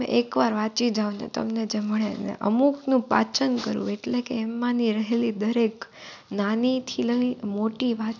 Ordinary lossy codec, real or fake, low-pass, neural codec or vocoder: none; real; 7.2 kHz; none